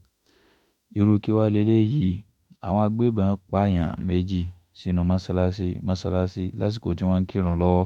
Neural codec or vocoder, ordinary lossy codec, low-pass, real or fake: autoencoder, 48 kHz, 32 numbers a frame, DAC-VAE, trained on Japanese speech; none; 19.8 kHz; fake